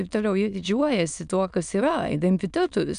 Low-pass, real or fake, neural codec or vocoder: 9.9 kHz; fake; autoencoder, 22.05 kHz, a latent of 192 numbers a frame, VITS, trained on many speakers